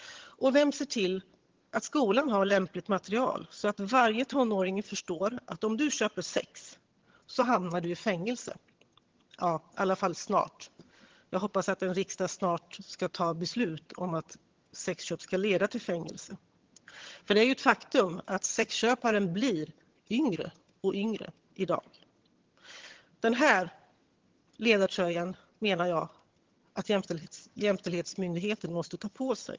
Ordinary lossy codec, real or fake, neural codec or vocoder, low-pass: Opus, 16 kbps; fake; vocoder, 22.05 kHz, 80 mel bands, HiFi-GAN; 7.2 kHz